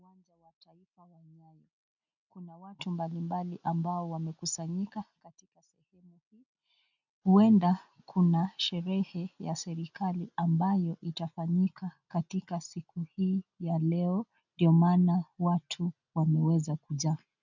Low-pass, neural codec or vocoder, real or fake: 7.2 kHz; none; real